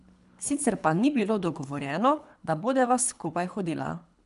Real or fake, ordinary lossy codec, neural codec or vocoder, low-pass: fake; none; codec, 24 kHz, 3 kbps, HILCodec; 10.8 kHz